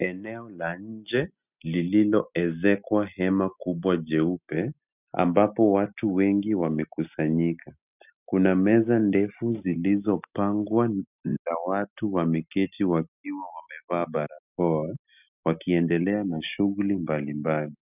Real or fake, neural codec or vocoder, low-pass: real; none; 3.6 kHz